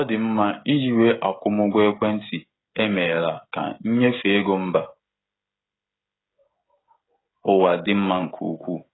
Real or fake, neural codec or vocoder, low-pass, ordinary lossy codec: fake; codec, 16 kHz, 16 kbps, FreqCodec, smaller model; 7.2 kHz; AAC, 16 kbps